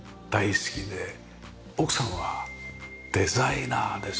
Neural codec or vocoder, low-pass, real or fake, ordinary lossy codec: none; none; real; none